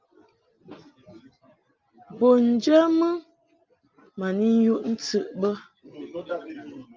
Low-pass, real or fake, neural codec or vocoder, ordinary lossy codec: 7.2 kHz; real; none; Opus, 24 kbps